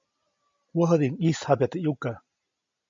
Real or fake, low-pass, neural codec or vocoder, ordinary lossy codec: real; 7.2 kHz; none; MP3, 48 kbps